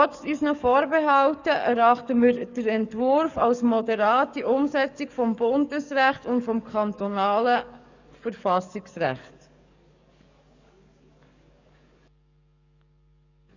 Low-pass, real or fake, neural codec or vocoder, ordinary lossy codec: 7.2 kHz; fake; codec, 44.1 kHz, 7.8 kbps, DAC; none